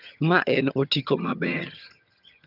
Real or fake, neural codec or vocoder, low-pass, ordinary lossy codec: fake; vocoder, 22.05 kHz, 80 mel bands, HiFi-GAN; 5.4 kHz; none